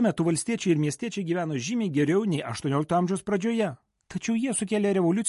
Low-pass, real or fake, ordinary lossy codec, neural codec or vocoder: 14.4 kHz; real; MP3, 48 kbps; none